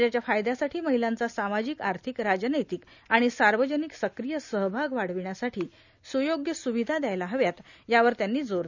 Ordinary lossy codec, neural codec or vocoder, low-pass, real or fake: none; none; 7.2 kHz; real